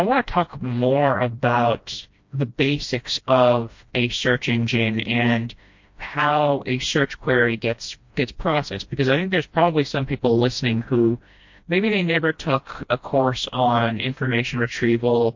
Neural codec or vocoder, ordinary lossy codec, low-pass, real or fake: codec, 16 kHz, 1 kbps, FreqCodec, smaller model; MP3, 48 kbps; 7.2 kHz; fake